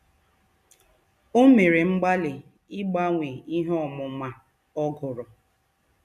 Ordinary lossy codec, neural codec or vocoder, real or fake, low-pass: none; none; real; 14.4 kHz